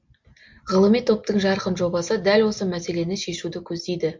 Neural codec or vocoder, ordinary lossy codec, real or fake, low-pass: none; MP3, 48 kbps; real; 7.2 kHz